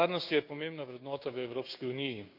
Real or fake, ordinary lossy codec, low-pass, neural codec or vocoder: fake; none; 5.4 kHz; codec, 16 kHz, 6 kbps, DAC